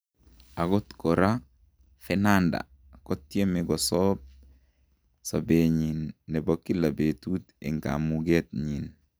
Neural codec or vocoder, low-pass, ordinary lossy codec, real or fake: none; none; none; real